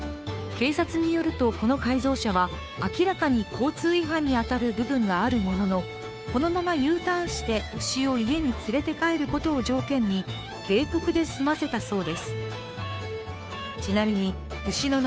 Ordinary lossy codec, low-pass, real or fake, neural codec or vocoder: none; none; fake; codec, 16 kHz, 2 kbps, FunCodec, trained on Chinese and English, 25 frames a second